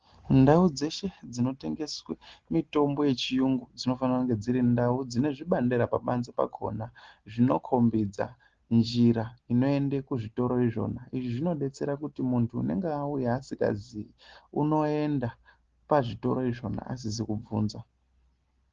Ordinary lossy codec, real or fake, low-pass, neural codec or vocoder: Opus, 16 kbps; real; 7.2 kHz; none